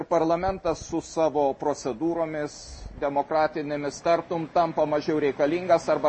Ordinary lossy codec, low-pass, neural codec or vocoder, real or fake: MP3, 32 kbps; 10.8 kHz; none; real